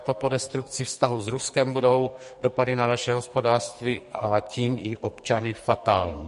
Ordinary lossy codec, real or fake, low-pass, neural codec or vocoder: MP3, 48 kbps; fake; 14.4 kHz; codec, 44.1 kHz, 2.6 kbps, SNAC